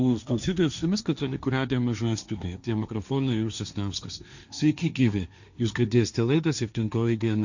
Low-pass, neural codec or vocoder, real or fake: 7.2 kHz; codec, 16 kHz, 1.1 kbps, Voila-Tokenizer; fake